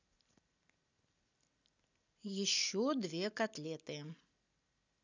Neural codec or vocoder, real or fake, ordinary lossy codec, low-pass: none; real; none; 7.2 kHz